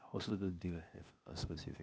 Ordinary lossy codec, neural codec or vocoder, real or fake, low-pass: none; codec, 16 kHz, 0.8 kbps, ZipCodec; fake; none